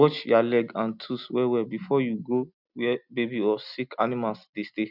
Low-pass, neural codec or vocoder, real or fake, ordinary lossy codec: 5.4 kHz; none; real; none